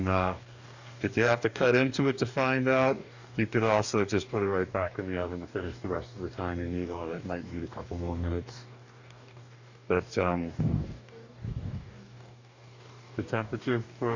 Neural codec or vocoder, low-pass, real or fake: codec, 44.1 kHz, 2.6 kbps, DAC; 7.2 kHz; fake